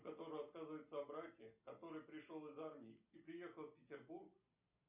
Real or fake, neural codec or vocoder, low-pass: real; none; 3.6 kHz